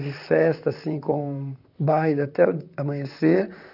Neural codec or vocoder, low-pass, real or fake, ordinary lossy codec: vocoder, 44.1 kHz, 128 mel bands, Pupu-Vocoder; 5.4 kHz; fake; none